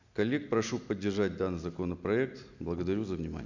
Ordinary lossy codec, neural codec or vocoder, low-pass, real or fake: none; none; 7.2 kHz; real